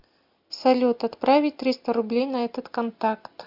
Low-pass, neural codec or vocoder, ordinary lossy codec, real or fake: 5.4 kHz; none; MP3, 48 kbps; real